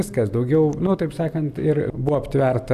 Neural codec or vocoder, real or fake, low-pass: none; real; 14.4 kHz